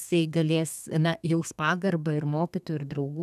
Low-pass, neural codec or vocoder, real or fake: 14.4 kHz; codec, 32 kHz, 1.9 kbps, SNAC; fake